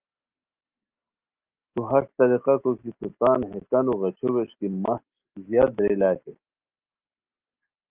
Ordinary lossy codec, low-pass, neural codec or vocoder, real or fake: Opus, 32 kbps; 3.6 kHz; none; real